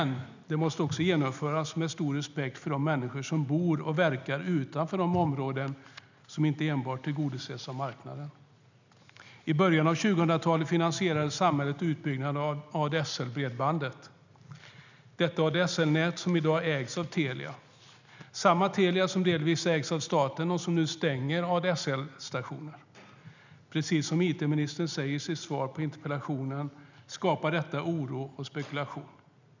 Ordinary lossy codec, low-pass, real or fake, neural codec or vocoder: none; 7.2 kHz; real; none